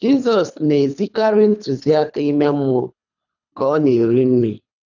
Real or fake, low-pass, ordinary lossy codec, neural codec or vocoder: fake; 7.2 kHz; none; codec, 24 kHz, 3 kbps, HILCodec